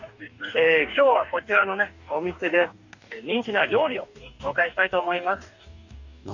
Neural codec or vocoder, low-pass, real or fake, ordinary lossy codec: codec, 44.1 kHz, 2.6 kbps, DAC; 7.2 kHz; fake; none